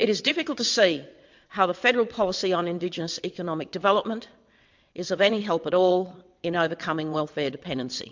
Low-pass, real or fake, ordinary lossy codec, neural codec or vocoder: 7.2 kHz; fake; MP3, 64 kbps; vocoder, 22.05 kHz, 80 mel bands, WaveNeXt